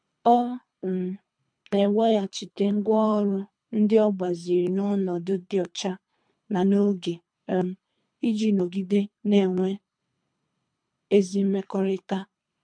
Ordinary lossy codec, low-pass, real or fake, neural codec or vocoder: MP3, 64 kbps; 9.9 kHz; fake; codec, 24 kHz, 3 kbps, HILCodec